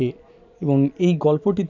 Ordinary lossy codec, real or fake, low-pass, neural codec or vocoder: none; real; 7.2 kHz; none